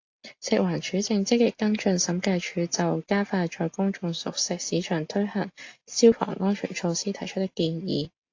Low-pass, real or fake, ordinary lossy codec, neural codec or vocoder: 7.2 kHz; real; AAC, 48 kbps; none